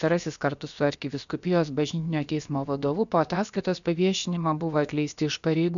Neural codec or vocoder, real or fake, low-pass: codec, 16 kHz, about 1 kbps, DyCAST, with the encoder's durations; fake; 7.2 kHz